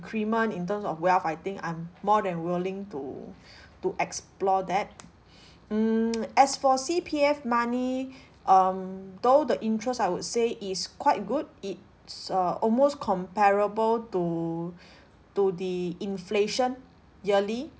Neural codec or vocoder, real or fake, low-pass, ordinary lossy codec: none; real; none; none